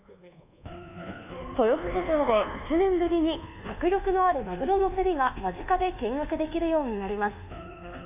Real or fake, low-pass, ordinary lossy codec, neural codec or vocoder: fake; 3.6 kHz; AAC, 32 kbps; codec, 24 kHz, 1.2 kbps, DualCodec